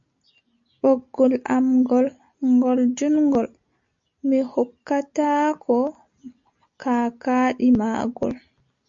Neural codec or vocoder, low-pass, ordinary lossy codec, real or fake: none; 7.2 kHz; MP3, 48 kbps; real